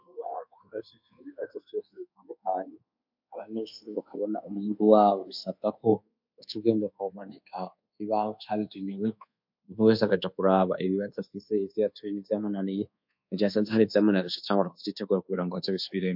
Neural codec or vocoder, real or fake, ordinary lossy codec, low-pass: codec, 24 kHz, 1.2 kbps, DualCodec; fake; MP3, 48 kbps; 5.4 kHz